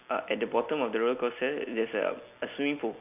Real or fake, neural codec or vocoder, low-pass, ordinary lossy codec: real; none; 3.6 kHz; none